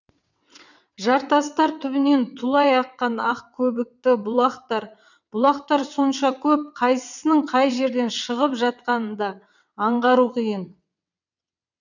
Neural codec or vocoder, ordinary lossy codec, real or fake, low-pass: vocoder, 22.05 kHz, 80 mel bands, WaveNeXt; none; fake; 7.2 kHz